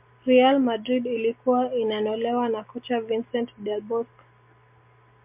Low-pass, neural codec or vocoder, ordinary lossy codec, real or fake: 3.6 kHz; none; MP3, 32 kbps; real